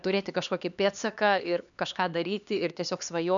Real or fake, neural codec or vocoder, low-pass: fake; codec, 16 kHz, 2 kbps, X-Codec, HuBERT features, trained on LibriSpeech; 7.2 kHz